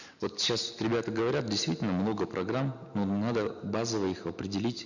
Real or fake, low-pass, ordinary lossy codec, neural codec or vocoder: real; 7.2 kHz; none; none